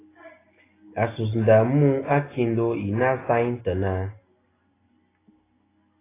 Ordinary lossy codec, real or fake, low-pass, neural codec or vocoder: AAC, 16 kbps; real; 3.6 kHz; none